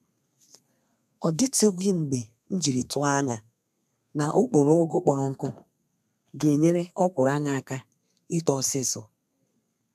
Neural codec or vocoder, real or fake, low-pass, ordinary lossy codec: codec, 32 kHz, 1.9 kbps, SNAC; fake; 14.4 kHz; none